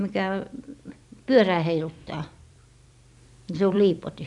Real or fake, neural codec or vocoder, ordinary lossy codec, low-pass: fake; vocoder, 44.1 kHz, 128 mel bands every 256 samples, BigVGAN v2; none; 10.8 kHz